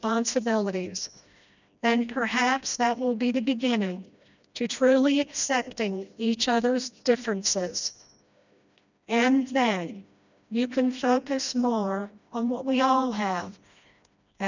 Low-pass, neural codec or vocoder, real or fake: 7.2 kHz; codec, 16 kHz, 1 kbps, FreqCodec, smaller model; fake